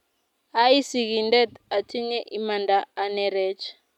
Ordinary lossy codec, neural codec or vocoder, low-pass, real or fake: none; none; 19.8 kHz; real